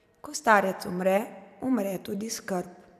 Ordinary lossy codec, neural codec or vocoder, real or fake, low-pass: none; vocoder, 44.1 kHz, 128 mel bands every 256 samples, BigVGAN v2; fake; 14.4 kHz